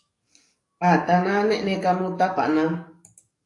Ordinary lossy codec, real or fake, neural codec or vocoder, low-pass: AAC, 48 kbps; fake; codec, 44.1 kHz, 7.8 kbps, DAC; 10.8 kHz